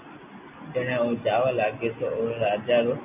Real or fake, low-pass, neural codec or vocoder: real; 3.6 kHz; none